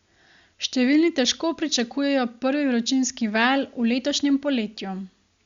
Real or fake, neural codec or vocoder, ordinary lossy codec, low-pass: real; none; Opus, 64 kbps; 7.2 kHz